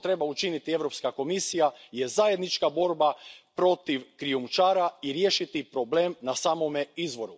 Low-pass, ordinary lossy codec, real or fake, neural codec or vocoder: none; none; real; none